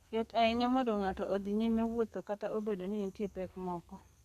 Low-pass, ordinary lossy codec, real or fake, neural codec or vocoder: 14.4 kHz; MP3, 96 kbps; fake; codec, 32 kHz, 1.9 kbps, SNAC